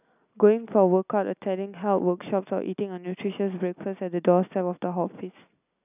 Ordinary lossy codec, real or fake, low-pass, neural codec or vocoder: none; real; 3.6 kHz; none